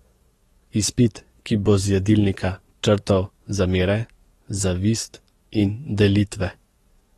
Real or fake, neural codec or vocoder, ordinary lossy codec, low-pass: fake; codec, 44.1 kHz, 7.8 kbps, DAC; AAC, 32 kbps; 19.8 kHz